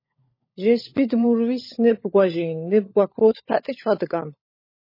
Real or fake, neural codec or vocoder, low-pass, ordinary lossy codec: fake; codec, 16 kHz, 16 kbps, FunCodec, trained on LibriTTS, 50 frames a second; 5.4 kHz; MP3, 24 kbps